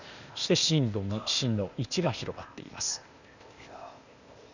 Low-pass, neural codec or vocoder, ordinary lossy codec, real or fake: 7.2 kHz; codec, 16 kHz, 0.8 kbps, ZipCodec; none; fake